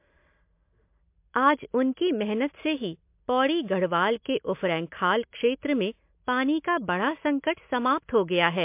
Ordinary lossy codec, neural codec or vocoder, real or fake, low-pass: MP3, 32 kbps; none; real; 3.6 kHz